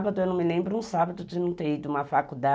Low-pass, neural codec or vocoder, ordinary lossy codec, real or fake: none; none; none; real